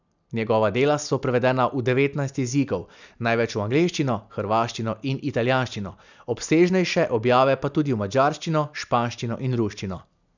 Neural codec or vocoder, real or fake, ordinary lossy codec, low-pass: none; real; none; 7.2 kHz